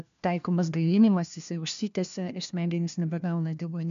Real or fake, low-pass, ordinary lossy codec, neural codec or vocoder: fake; 7.2 kHz; MP3, 64 kbps; codec, 16 kHz, 1 kbps, FunCodec, trained on LibriTTS, 50 frames a second